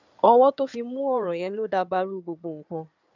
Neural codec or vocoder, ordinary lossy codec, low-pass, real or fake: codec, 16 kHz in and 24 kHz out, 2.2 kbps, FireRedTTS-2 codec; none; 7.2 kHz; fake